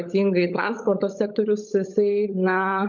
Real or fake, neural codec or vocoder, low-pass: fake; codec, 16 kHz, 16 kbps, FunCodec, trained on LibriTTS, 50 frames a second; 7.2 kHz